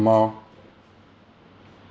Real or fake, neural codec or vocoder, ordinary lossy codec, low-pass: real; none; none; none